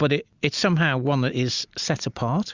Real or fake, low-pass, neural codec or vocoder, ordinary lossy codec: real; 7.2 kHz; none; Opus, 64 kbps